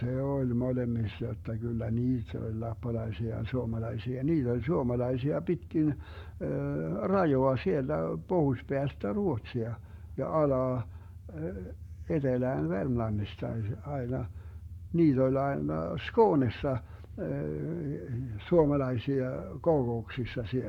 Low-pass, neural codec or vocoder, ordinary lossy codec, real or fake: 19.8 kHz; codec, 44.1 kHz, 7.8 kbps, Pupu-Codec; none; fake